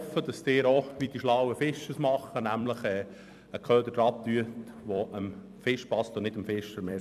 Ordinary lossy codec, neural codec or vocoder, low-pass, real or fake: none; vocoder, 44.1 kHz, 128 mel bands every 256 samples, BigVGAN v2; 14.4 kHz; fake